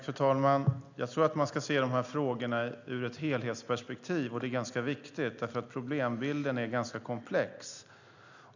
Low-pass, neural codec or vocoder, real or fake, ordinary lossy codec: 7.2 kHz; none; real; none